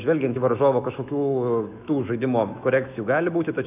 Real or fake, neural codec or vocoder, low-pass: fake; autoencoder, 48 kHz, 128 numbers a frame, DAC-VAE, trained on Japanese speech; 3.6 kHz